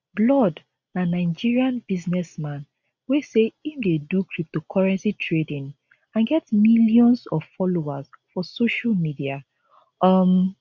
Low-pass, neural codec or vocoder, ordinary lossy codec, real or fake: 7.2 kHz; none; none; real